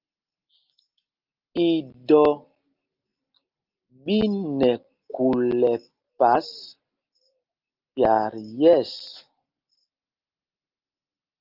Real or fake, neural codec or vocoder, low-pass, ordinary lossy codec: real; none; 5.4 kHz; Opus, 32 kbps